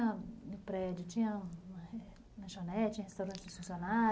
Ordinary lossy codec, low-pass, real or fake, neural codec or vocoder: none; none; real; none